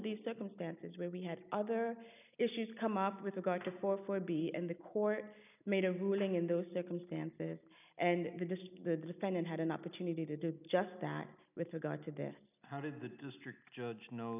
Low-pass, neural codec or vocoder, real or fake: 3.6 kHz; none; real